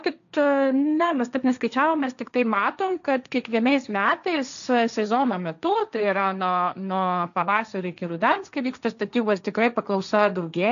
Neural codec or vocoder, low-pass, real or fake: codec, 16 kHz, 1.1 kbps, Voila-Tokenizer; 7.2 kHz; fake